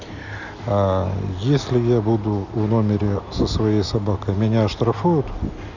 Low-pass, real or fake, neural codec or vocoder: 7.2 kHz; real; none